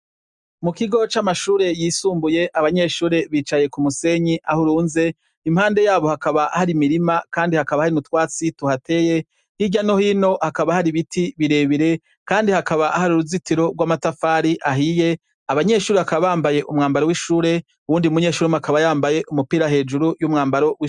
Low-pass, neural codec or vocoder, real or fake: 10.8 kHz; none; real